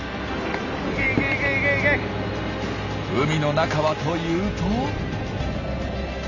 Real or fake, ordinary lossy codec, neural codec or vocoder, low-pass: real; none; none; 7.2 kHz